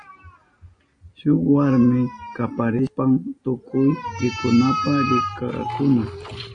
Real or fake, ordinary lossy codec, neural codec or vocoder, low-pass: real; AAC, 48 kbps; none; 9.9 kHz